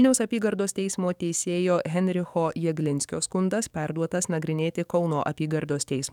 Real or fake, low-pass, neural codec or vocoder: fake; 19.8 kHz; codec, 44.1 kHz, 7.8 kbps, DAC